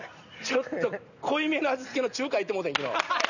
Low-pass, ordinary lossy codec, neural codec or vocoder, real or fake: 7.2 kHz; none; none; real